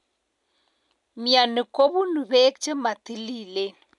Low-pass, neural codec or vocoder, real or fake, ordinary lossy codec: 10.8 kHz; none; real; none